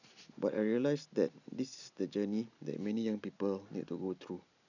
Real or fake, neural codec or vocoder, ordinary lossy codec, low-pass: real; none; none; 7.2 kHz